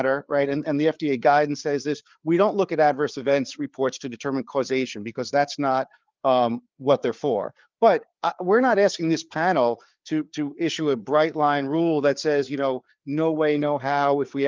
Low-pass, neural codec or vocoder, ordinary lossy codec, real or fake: 7.2 kHz; codec, 16 kHz, 4 kbps, FreqCodec, larger model; Opus, 24 kbps; fake